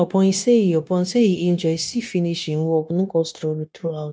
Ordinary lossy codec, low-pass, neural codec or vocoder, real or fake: none; none; codec, 16 kHz, 0.9 kbps, LongCat-Audio-Codec; fake